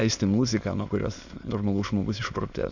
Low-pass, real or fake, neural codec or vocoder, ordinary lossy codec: 7.2 kHz; fake; autoencoder, 22.05 kHz, a latent of 192 numbers a frame, VITS, trained on many speakers; Opus, 64 kbps